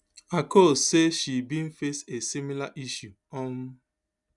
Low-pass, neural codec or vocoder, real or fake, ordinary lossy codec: 10.8 kHz; none; real; none